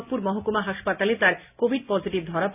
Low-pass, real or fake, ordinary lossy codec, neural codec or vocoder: 3.6 kHz; real; none; none